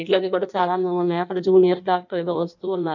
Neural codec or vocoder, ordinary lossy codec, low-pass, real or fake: codec, 16 kHz in and 24 kHz out, 1.1 kbps, FireRedTTS-2 codec; none; 7.2 kHz; fake